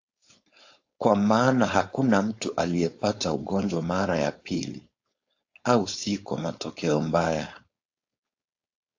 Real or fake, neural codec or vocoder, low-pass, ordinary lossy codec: fake; codec, 16 kHz, 4.8 kbps, FACodec; 7.2 kHz; AAC, 48 kbps